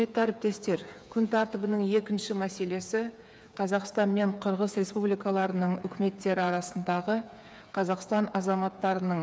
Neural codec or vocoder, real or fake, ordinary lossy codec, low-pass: codec, 16 kHz, 8 kbps, FreqCodec, smaller model; fake; none; none